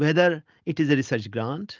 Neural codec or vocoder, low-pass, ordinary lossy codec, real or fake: none; 7.2 kHz; Opus, 24 kbps; real